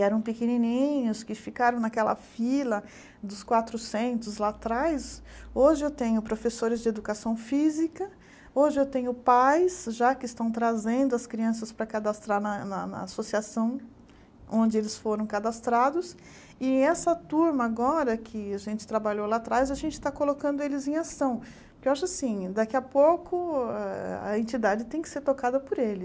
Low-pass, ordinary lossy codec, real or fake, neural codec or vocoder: none; none; real; none